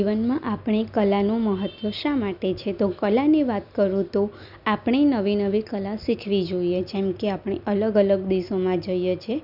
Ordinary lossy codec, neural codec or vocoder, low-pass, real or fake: none; none; 5.4 kHz; real